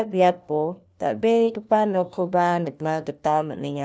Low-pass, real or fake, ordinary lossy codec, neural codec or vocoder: none; fake; none; codec, 16 kHz, 1 kbps, FunCodec, trained on LibriTTS, 50 frames a second